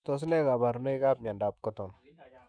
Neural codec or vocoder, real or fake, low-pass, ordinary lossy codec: autoencoder, 48 kHz, 128 numbers a frame, DAC-VAE, trained on Japanese speech; fake; 9.9 kHz; none